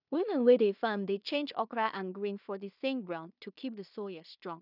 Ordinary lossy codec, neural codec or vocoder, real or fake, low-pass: none; codec, 16 kHz in and 24 kHz out, 0.4 kbps, LongCat-Audio-Codec, two codebook decoder; fake; 5.4 kHz